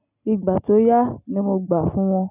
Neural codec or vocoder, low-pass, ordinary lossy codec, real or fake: none; 3.6 kHz; none; real